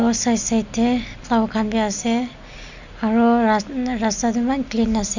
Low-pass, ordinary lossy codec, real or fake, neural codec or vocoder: 7.2 kHz; none; real; none